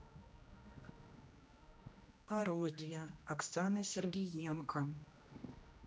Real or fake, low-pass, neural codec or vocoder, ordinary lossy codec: fake; none; codec, 16 kHz, 1 kbps, X-Codec, HuBERT features, trained on general audio; none